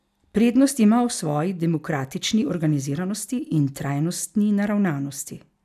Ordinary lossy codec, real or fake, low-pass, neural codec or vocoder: none; real; 14.4 kHz; none